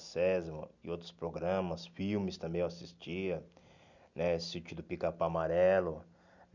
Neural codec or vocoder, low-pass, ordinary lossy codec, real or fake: none; 7.2 kHz; none; real